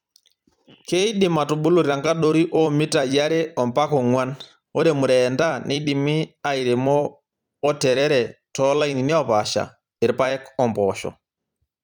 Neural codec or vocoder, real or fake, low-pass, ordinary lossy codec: vocoder, 44.1 kHz, 128 mel bands every 256 samples, BigVGAN v2; fake; 19.8 kHz; none